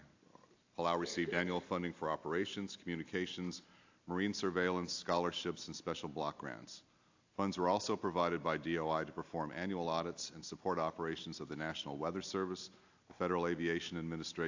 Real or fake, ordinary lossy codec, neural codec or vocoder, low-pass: real; AAC, 48 kbps; none; 7.2 kHz